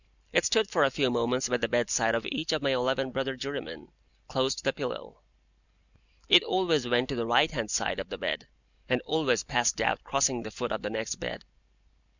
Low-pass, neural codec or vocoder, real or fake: 7.2 kHz; none; real